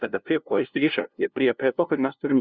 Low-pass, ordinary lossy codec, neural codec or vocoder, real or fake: 7.2 kHz; Opus, 64 kbps; codec, 16 kHz, 0.5 kbps, FunCodec, trained on LibriTTS, 25 frames a second; fake